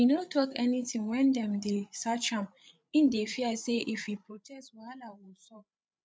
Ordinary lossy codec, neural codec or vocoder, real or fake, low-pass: none; codec, 16 kHz, 8 kbps, FreqCodec, larger model; fake; none